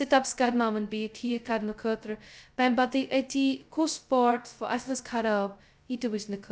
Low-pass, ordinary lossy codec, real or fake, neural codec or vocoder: none; none; fake; codec, 16 kHz, 0.2 kbps, FocalCodec